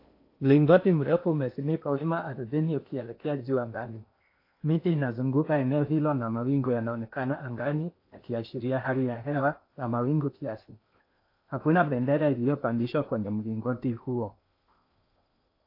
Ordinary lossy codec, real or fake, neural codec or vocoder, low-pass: AAC, 32 kbps; fake; codec, 16 kHz in and 24 kHz out, 0.8 kbps, FocalCodec, streaming, 65536 codes; 5.4 kHz